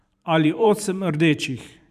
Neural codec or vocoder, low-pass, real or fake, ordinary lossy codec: vocoder, 44.1 kHz, 128 mel bands every 512 samples, BigVGAN v2; 14.4 kHz; fake; none